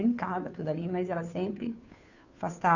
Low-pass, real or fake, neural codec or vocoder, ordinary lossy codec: 7.2 kHz; fake; codec, 16 kHz, 2 kbps, FunCodec, trained on Chinese and English, 25 frames a second; none